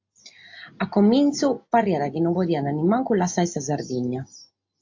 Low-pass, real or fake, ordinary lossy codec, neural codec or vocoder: 7.2 kHz; real; AAC, 48 kbps; none